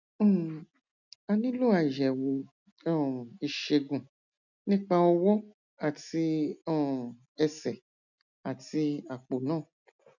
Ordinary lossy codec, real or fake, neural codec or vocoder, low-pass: none; real; none; 7.2 kHz